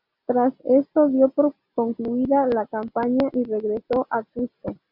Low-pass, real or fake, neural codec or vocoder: 5.4 kHz; real; none